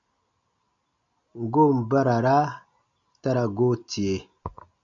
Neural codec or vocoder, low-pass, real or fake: none; 7.2 kHz; real